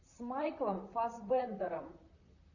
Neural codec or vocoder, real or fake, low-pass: vocoder, 44.1 kHz, 128 mel bands, Pupu-Vocoder; fake; 7.2 kHz